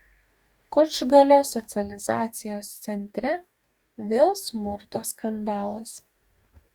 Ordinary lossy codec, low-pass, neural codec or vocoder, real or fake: Opus, 64 kbps; 19.8 kHz; codec, 44.1 kHz, 2.6 kbps, DAC; fake